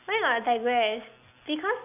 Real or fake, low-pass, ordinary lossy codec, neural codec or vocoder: real; 3.6 kHz; none; none